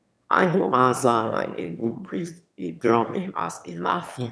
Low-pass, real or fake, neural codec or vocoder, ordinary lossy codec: none; fake; autoencoder, 22.05 kHz, a latent of 192 numbers a frame, VITS, trained on one speaker; none